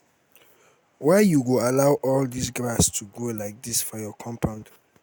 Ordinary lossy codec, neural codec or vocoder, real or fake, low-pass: none; none; real; none